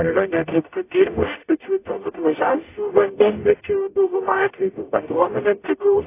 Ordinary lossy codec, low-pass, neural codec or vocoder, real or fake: AAC, 24 kbps; 3.6 kHz; codec, 44.1 kHz, 0.9 kbps, DAC; fake